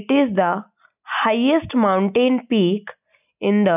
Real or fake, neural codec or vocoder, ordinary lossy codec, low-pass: real; none; none; 3.6 kHz